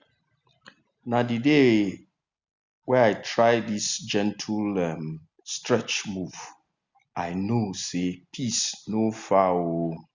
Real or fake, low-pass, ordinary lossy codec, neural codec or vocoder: real; 7.2 kHz; Opus, 64 kbps; none